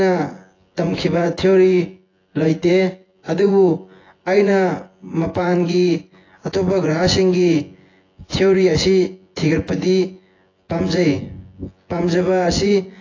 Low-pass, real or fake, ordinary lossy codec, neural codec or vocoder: 7.2 kHz; fake; AAC, 32 kbps; vocoder, 24 kHz, 100 mel bands, Vocos